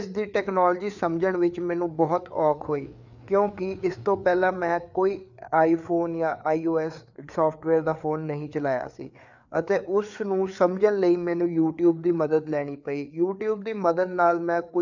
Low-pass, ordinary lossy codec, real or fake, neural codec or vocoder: 7.2 kHz; none; fake; codec, 16 kHz, 4 kbps, FreqCodec, larger model